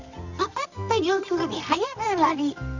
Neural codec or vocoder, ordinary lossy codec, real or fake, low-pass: codec, 24 kHz, 0.9 kbps, WavTokenizer, medium music audio release; none; fake; 7.2 kHz